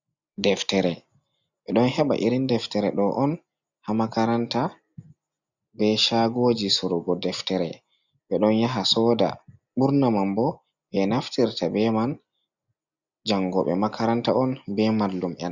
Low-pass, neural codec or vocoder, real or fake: 7.2 kHz; none; real